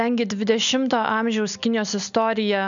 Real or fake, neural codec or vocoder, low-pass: real; none; 7.2 kHz